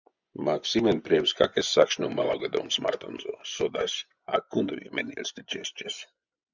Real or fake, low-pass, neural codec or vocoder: fake; 7.2 kHz; vocoder, 24 kHz, 100 mel bands, Vocos